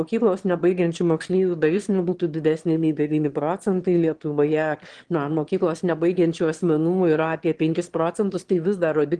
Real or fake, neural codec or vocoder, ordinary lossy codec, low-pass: fake; autoencoder, 22.05 kHz, a latent of 192 numbers a frame, VITS, trained on one speaker; Opus, 16 kbps; 9.9 kHz